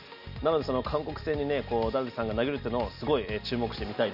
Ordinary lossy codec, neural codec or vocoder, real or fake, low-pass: none; none; real; 5.4 kHz